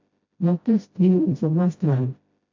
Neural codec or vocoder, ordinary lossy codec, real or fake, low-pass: codec, 16 kHz, 0.5 kbps, FreqCodec, smaller model; MP3, 48 kbps; fake; 7.2 kHz